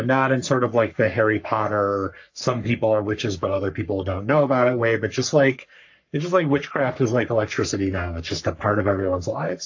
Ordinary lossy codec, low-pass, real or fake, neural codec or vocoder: AAC, 48 kbps; 7.2 kHz; fake; codec, 44.1 kHz, 3.4 kbps, Pupu-Codec